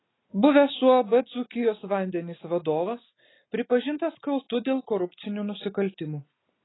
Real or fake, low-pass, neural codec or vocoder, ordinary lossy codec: real; 7.2 kHz; none; AAC, 16 kbps